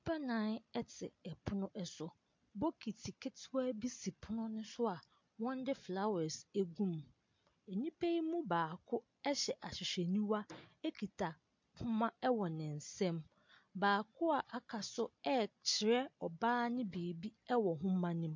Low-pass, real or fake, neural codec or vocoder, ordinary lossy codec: 7.2 kHz; real; none; MP3, 48 kbps